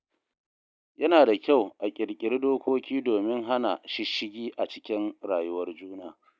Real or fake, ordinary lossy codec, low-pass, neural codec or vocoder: real; none; none; none